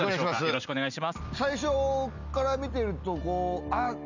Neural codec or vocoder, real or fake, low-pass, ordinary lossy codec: none; real; 7.2 kHz; none